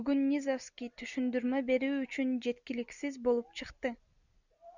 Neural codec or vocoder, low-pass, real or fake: none; 7.2 kHz; real